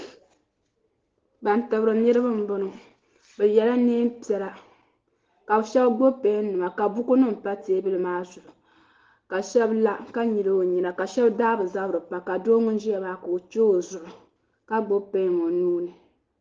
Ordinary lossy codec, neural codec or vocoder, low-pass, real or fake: Opus, 16 kbps; none; 7.2 kHz; real